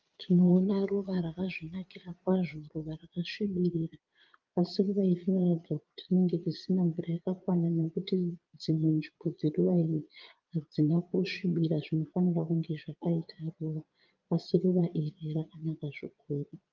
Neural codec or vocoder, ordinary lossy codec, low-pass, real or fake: vocoder, 22.05 kHz, 80 mel bands, Vocos; Opus, 32 kbps; 7.2 kHz; fake